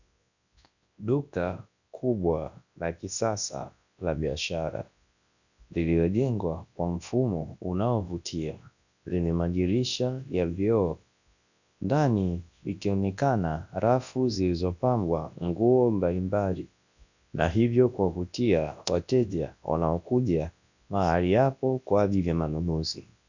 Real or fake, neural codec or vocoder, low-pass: fake; codec, 24 kHz, 0.9 kbps, WavTokenizer, large speech release; 7.2 kHz